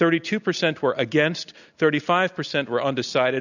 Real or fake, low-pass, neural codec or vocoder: real; 7.2 kHz; none